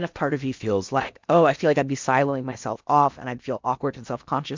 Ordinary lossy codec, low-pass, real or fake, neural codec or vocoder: MP3, 64 kbps; 7.2 kHz; fake; codec, 16 kHz in and 24 kHz out, 0.8 kbps, FocalCodec, streaming, 65536 codes